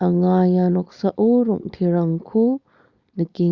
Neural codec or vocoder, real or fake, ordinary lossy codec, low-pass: codec, 16 kHz, 4.8 kbps, FACodec; fake; Opus, 64 kbps; 7.2 kHz